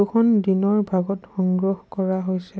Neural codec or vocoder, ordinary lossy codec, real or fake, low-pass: none; none; real; none